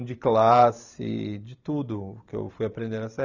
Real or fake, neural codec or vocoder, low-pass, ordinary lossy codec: fake; vocoder, 44.1 kHz, 128 mel bands every 512 samples, BigVGAN v2; 7.2 kHz; none